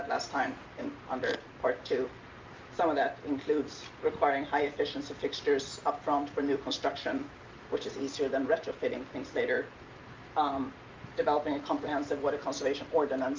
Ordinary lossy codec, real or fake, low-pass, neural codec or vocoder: Opus, 32 kbps; real; 7.2 kHz; none